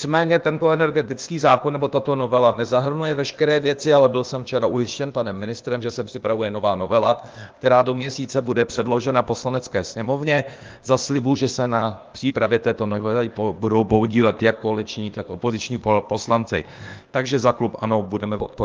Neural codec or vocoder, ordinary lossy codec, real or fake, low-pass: codec, 16 kHz, 0.8 kbps, ZipCodec; Opus, 32 kbps; fake; 7.2 kHz